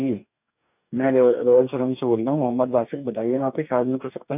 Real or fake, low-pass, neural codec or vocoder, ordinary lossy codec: fake; 3.6 kHz; codec, 44.1 kHz, 2.6 kbps, DAC; none